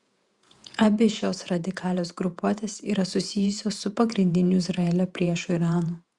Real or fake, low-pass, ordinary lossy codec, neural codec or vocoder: fake; 10.8 kHz; Opus, 64 kbps; vocoder, 48 kHz, 128 mel bands, Vocos